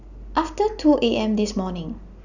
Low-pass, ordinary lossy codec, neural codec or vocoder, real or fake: 7.2 kHz; none; none; real